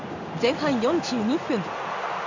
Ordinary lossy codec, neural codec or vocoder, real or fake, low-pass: none; codec, 16 kHz in and 24 kHz out, 1 kbps, XY-Tokenizer; fake; 7.2 kHz